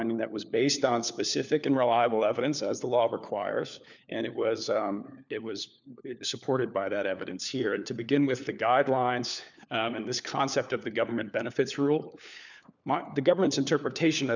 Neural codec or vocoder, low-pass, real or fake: codec, 16 kHz, 4 kbps, FunCodec, trained on LibriTTS, 50 frames a second; 7.2 kHz; fake